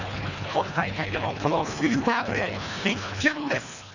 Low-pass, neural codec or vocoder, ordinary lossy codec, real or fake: 7.2 kHz; codec, 24 kHz, 1.5 kbps, HILCodec; none; fake